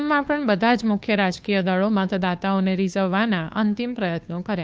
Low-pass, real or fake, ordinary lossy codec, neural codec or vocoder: none; fake; none; codec, 16 kHz, 2 kbps, FunCodec, trained on Chinese and English, 25 frames a second